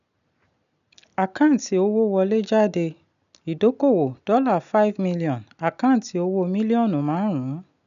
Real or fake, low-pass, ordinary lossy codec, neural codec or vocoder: real; 7.2 kHz; none; none